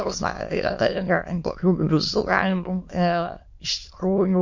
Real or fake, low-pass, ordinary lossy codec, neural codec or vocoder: fake; 7.2 kHz; MP3, 48 kbps; autoencoder, 22.05 kHz, a latent of 192 numbers a frame, VITS, trained on many speakers